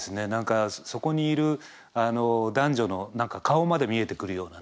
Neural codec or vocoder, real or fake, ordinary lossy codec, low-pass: none; real; none; none